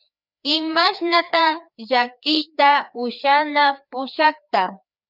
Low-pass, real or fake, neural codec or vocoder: 5.4 kHz; fake; codec, 16 kHz, 2 kbps, FreqCodec, larger model